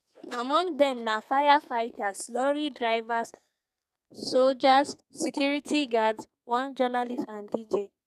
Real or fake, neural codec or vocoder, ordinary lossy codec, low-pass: fake; codec, 32 kHz, 1.9 kbps, SNAC; none; 14.4 kHz